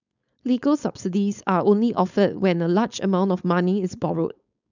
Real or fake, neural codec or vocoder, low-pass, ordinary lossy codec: fake; codec, 16 kHz, 4.8 kbps, FACodec; 7.2 kHz; none